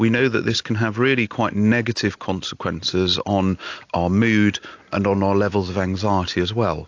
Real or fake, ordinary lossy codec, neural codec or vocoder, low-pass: real; AAC, 48 kbps; none; 7.2 kHz